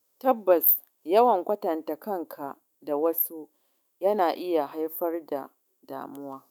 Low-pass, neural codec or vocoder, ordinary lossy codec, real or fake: none; autoencoder, 48 kHz, 128 numbers a frame, DAC-VAE, trained on Japanese speech; none; fake